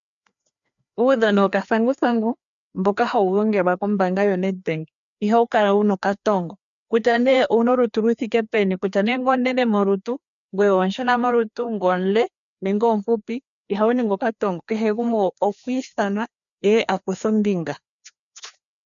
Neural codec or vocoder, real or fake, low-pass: codec, 16 kHz, 2 kbps, FreqCodec, larger model; fake; 7.2 kHz